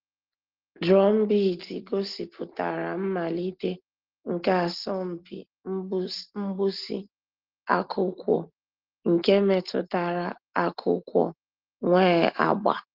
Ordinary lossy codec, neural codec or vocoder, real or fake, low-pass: Opus, 16 kbps; none; real; 5.4 kHz